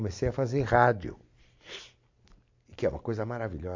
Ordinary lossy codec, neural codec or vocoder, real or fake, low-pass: MP3, 48 kbps; none; real; 7.2 kHz